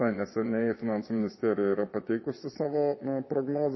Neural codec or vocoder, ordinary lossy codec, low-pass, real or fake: vocoder, 22.05 kHz, 80 mel bands, Vocos; MP3, 24 kbps; 7.2 kHz; fake